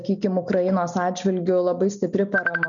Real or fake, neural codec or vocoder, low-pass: real; none; 7.2 kHz